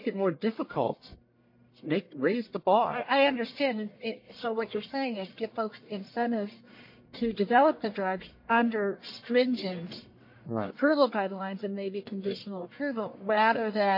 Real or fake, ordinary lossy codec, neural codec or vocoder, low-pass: fake; MP3, 32 kbps; codec, 44.1 kHz, 1.7 kbps, Pupu-Codec; 5.4 kHz